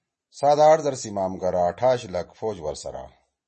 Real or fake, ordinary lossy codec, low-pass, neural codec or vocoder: real; MP3, 32 kbps; 9.9 kHz; none